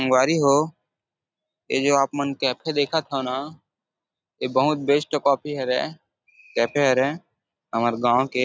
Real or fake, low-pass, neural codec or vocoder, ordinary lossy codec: real; none; none; none